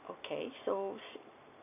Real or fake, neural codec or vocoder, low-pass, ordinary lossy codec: real; none; 3.6 kHz; none